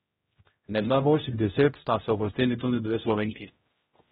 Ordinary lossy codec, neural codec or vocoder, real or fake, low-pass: AAC, 16 kbps; codec, 16 kHz, 0.5 kbps, X-Codec, HuBERT features, trained on general audio; fake; 7.2 kHz